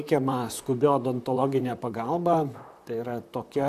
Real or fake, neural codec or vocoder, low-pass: fake; vocoder, 44.1 kHz, 128 mel bands, Pupu-Vocoder; 14.4 kHz